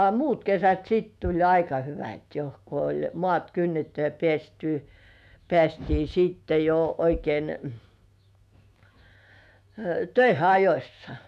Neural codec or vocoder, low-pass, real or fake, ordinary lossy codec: autoencoder, 48 kHz, 128 numbers a frame, DAC-VAE, trained on Japanese speech; 14.4 kHz; fake; none